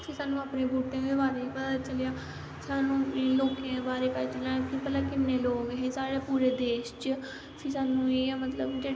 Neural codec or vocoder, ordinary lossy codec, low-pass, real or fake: none; none; none; real